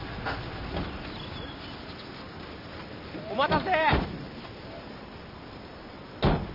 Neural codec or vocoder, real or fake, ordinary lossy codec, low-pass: vocoder, 44.1 kHz, 128 mel bands every 256 samples, BigVGAN v2; fake; none; 5.4 kHz